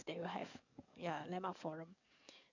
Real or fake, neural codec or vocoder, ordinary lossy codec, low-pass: fake; codec, 44.1 kHz, 7.8 kbps, DAC; none; 7.2 kHz